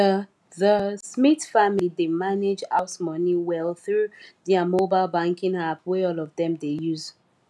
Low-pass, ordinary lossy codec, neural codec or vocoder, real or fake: none; none; none; real